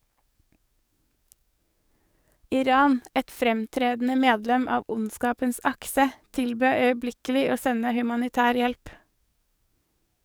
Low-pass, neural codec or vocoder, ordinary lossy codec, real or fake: none; codec, 44.1 kHz, 7.8 kbps, DAC; none; fake